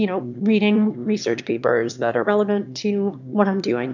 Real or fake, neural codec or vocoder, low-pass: fake; autoencoder, 22.05 kHz, a latent of 192 numbers a frame, VITS, trained on one speaker; 7.2 kHz